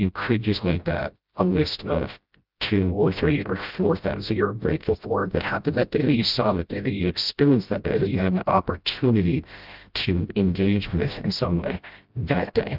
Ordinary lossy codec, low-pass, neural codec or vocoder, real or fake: Opus, 24 kbps; 5.4 kHz; codec, 16 kHz, 0.5 kbps, FreqCodec, smaller model; fake